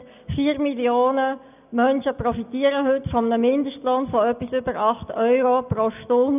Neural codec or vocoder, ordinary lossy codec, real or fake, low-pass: none; none; real; 3.6 kHz